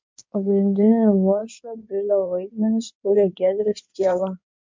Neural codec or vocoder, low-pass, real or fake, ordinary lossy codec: codec, 16 kHz in and 24 kHz out, 2.2 kbps, FireRedTTS-2 codec; 7.2 kHz; fake; MP3, 64 kbps